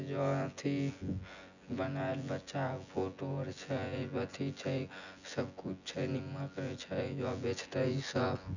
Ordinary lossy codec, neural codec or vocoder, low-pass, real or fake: none; vocoder, 24 kHz, 100 mel bands, Vocos; 7.2 kHz; fake